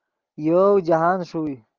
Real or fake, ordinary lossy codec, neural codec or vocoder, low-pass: real; Opus, 24 kbps; none; 7.2 kHz